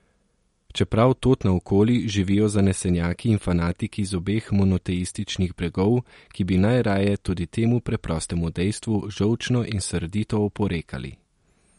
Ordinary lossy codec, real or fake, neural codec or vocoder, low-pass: MP3, 48 kbps; real; none; 19.8 kHz